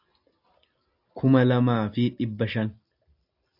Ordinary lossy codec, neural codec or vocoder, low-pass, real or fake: AAC, 48 kbps; none; 5.4 kHz; real